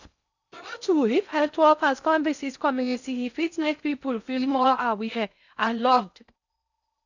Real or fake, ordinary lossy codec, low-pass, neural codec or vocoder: fake; none; 7.2 kHz; codec, 16 kHz in and 24 kHz out, 0.6 kbps, FocalCodec, streaming, 4096 codes